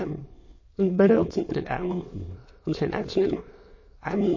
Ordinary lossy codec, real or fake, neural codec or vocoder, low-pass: MP3, 32 kbps; fake; autoencoder, 22.05 kHz, a latent of 192 numbers a frame, VITS, trained on many speakers; 7.2 kHz